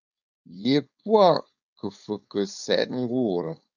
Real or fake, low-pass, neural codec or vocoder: fake; 7.2 kHz; codec, 16 kHz, 4.8 kbps, FACodec